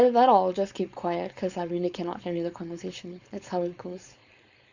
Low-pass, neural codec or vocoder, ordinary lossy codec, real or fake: 7.2 kHz; codec, 16 kHz, 4.8 kbps, FACodec; Opus, 64 kbps; fake